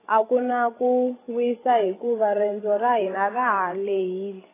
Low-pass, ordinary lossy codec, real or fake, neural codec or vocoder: 3.6 kHz; AAC, 16 kbps; fake; codec, 16 kHz, 16 kbps, FreqCodec, larger model